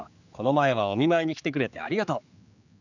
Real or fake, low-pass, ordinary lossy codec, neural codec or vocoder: fake; 7.2 kHz; none; codec, 16 kHz, 4 kbps, X-Codec, HuBERT features, trained on general audio